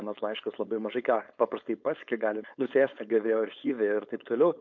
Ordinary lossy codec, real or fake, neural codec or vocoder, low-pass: MP3, 48 kbps; fake; codec, 16 kHz, 4.8 kbps, FACodec; 7.2 kHz